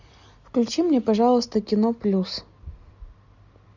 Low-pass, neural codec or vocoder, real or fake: 7.2 kHz; none; real